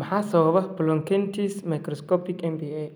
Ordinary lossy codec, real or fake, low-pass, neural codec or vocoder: none; real; none; none